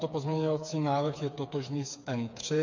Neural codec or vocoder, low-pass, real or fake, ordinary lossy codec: codec, 16 kHz, 4 kbps, FreqCodec, smaller model; 7.2 kHz; fake; MP3, 48 kbps